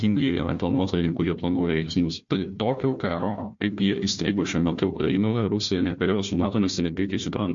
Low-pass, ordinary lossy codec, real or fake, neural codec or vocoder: 7.2 kHz; MP3, 48 kbps; fake; codec, 16 kHz, 1 kbps, FunCodec, trained on Chinese and English, 50 frames a second